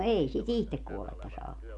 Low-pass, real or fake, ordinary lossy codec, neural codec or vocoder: 10.8 kHz; real; none; none